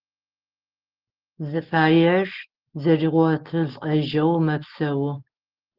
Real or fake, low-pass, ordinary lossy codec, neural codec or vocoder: real; 5.4 kHz; Opus, 16 kbps; none